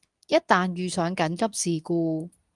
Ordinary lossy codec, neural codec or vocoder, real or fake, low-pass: Opus, 32 kbps; codec, 24 kHz, 0.9 kbps, WavTokenizer, medium speech release version 2; fake; 10.8 kHz